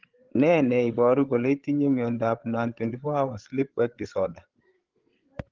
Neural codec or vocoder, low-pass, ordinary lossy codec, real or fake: codec, 16 kHz, 8 kbps, FreqCodec, larger model; 7.2 kHz; Opus, 16 kbps; fake